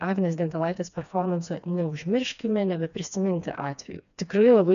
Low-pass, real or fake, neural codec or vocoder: 7.2 kHz; fake; codec, 16 kHz, 2 kbps, FreqCodec, smaller model